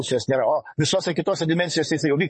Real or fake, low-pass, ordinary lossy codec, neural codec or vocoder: fake; 10.8 kHz; MP3, 32 kbps; vocoder, 44.1 kHz, 128 mel bands, Pupu-Vocoder